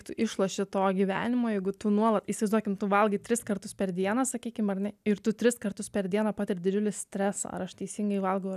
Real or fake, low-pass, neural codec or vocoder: real; 14.4 kHz; none